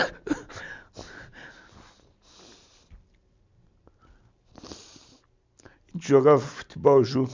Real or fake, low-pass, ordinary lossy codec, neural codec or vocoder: real; 7.2 kHz; MP3, 64 kbps; none